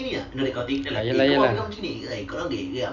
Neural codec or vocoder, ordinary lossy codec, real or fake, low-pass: none; none; real; 7.2 kHz